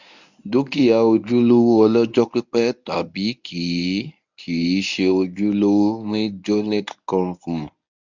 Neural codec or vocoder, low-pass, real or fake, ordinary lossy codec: codec, 24 kHz, 0.9 kbps, WavTokenizer, medium speech release version 1; 7.2 kHz; fake; none